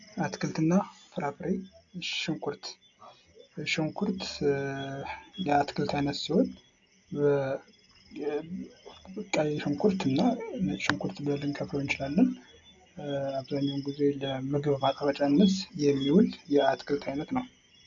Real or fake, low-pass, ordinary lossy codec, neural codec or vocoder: real; 7.2 kHz; AAC, 64 kbps; none